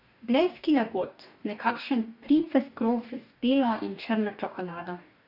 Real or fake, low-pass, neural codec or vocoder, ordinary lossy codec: fake; 5.4 kHz; codec, 44.1 kHz, 2.6 kbps, DAC; none